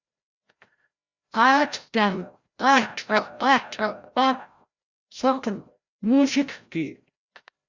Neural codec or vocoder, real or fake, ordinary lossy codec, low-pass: codec, 16 kHz, 0.5 kbps, FreqCodec, larger model; fake; Opus, 64 kbps; 7.2 kHz